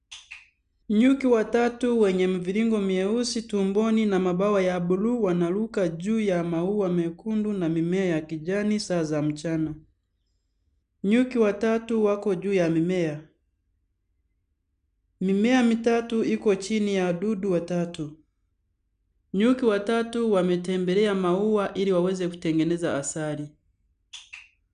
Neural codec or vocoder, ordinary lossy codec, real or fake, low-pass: none; none; real; 9.9 kHz